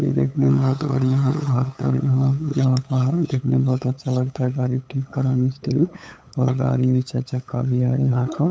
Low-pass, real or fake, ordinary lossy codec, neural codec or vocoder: none; fake; none; codec, 16 kHz, 8 kbps, FunCodec, trained on LibriTTS, 25 frames a second